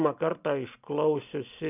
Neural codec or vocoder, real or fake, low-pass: none; real; 3.6 kHz